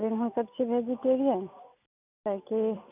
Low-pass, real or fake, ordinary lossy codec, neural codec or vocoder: 3.6 kHz; real; none; none